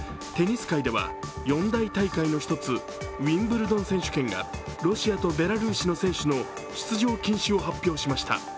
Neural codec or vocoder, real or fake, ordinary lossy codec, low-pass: none; real; none; none